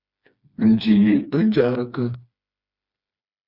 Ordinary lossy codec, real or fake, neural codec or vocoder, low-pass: Opus, 64 kbps; fake; codec, 16 kHz, 2 kbps, FreqCodec, smaller model; 5.4 kHz